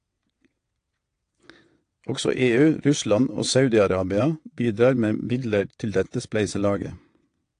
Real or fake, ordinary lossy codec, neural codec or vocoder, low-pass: fake; AAC, 48 kbps; vocoder, 22.05 kHz, 80 mel bands, WaveNeXt; 9.9 kHz